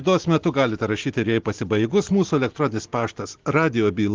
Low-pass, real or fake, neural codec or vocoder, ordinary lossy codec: 7.2 kHz; real; none; Opus, 16 kbps